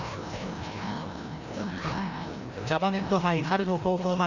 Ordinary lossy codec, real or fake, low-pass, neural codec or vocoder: none; fake; 7.2 kHz; codec, 16 kHz, 1 kbps, FreqCodec, larger model